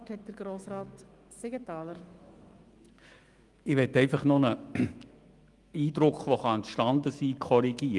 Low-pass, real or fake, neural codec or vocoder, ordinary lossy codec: 10.8 kHz; fake; autoencoder, 48 kHz, 128 numbers a frame, DAC-VAE, trained on Japanese speech; Opus, 24 kbps